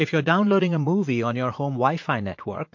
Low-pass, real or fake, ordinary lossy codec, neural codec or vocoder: 7.2 kHz; fake; MP3, 48 kbps; vocoder, 44.1 kHz, 80 mel bands, Vocos